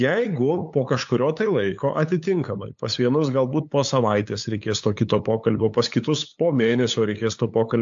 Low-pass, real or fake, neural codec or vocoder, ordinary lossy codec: 7.2 kHz; fake; codec, 16 kHz, 8 kbps, FunCodec, trained on LibriTTS, 25 frames a second; AAC, 64 kbps